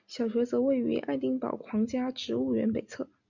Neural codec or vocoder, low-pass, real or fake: none; 7.2 kHz; real